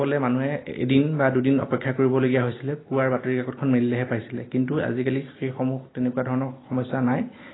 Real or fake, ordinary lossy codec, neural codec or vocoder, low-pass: real; AAC, 16 kbps; none; 7.2 kHz